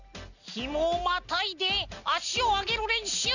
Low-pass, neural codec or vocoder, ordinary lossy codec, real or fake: 7.2 kHz; none; none; real